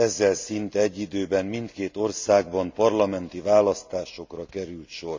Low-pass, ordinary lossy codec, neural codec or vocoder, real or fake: 7.2 kHz; MP3, 64 kbps; none; real